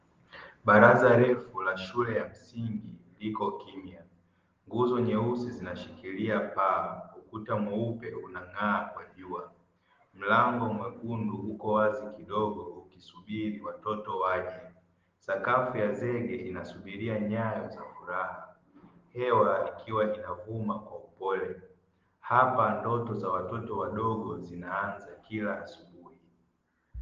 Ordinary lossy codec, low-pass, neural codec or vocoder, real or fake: Opus, 16 kbps; 7.2 kHz; none; real